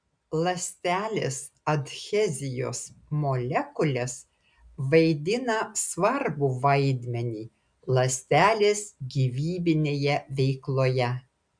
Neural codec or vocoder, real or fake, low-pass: none; real; 9.9 kHz